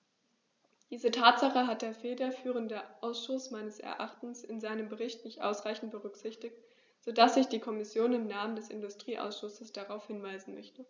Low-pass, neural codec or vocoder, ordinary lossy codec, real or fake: none; none; none; real